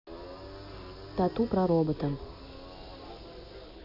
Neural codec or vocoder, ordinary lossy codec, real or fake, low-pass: none; none; real; 5.4 kHz